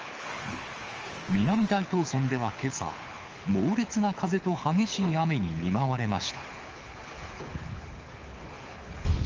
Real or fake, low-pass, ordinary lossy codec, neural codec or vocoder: fake; 7.2 kHz; Opus, 24 kbps; codec, 24 kHz, 6 kbps, HILCodec